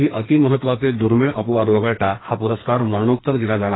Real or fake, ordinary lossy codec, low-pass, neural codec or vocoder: fake; AAC, 16 kbps; 7.2 kHz; codec, 44.1 kHz, 2.6 kbps, SNAC